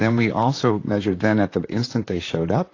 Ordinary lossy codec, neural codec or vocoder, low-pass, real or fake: AAC, 32 kbps; vocoder, 44.1 kHz, 128 mel bands, Pupu-Vocoder; 7.2 kHz; fake